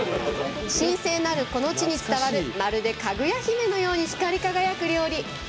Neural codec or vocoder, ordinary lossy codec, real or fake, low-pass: none; none; real; none